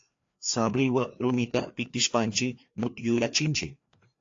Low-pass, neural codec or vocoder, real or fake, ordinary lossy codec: 7.2 kHz; codec, 16 kHz, 2 kbps, FreqCodec, larger model; fake; AAC, 48 kbps